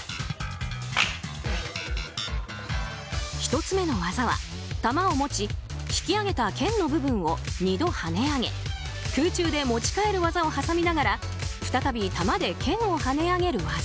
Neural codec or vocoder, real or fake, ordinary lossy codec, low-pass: none; real; none; none